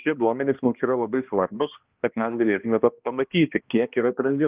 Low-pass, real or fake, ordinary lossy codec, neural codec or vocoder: 3.6 kHz; fake; Opus, 32 kbps; codec, 16 kHz, 1 kbps, X-Codec, HuBERT features, trained on balanced general audio